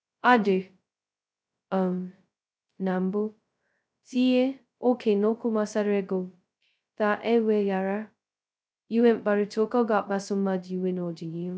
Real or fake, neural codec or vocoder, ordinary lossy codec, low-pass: fake; codec, 16 kHz, 0.2 kbps, FocalCodec; none; none